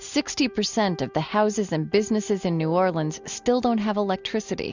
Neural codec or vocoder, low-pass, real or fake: none; 7.2 kHz; real